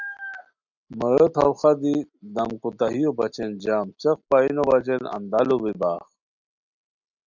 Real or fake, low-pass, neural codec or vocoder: real; 7.2 kHz; none